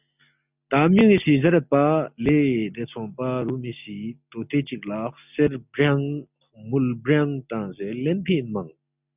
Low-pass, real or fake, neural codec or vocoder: 3.6 kHz; real; none